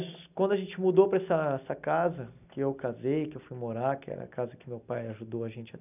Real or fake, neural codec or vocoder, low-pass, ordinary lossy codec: real; none; 3.6 kHz; none